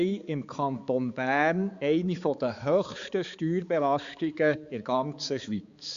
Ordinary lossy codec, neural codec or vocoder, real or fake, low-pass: Opus, 64 kbps; codec, 16 kHz, 4 kbps, X-Codec, HuBERT features, trained on balanced general audio; fake; 7.2 kHz